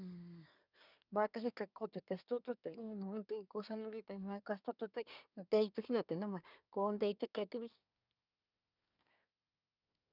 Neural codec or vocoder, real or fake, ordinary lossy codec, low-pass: codec, 24 kHz, 1 kbps, SNAC; fake; none; 5.4 kHz